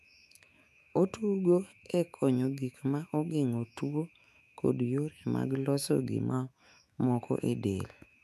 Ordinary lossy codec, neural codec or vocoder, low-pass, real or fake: none; autoencoder, 48 kHz, 128 numbers a frame, DAC-VAE, trained on Japanese speech; 14.4 kHz; fake